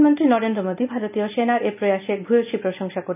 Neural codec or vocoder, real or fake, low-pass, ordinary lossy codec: none; real; 3.6 kHz; none